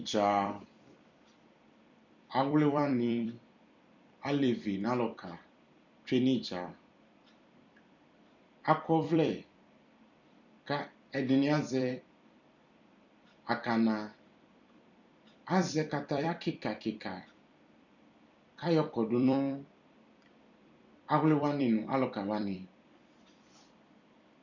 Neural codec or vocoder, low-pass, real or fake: vocoder, 44.1 kHz, 128 mel bands every 256 samples, BigVGAN v2; 7.2 kHz; fake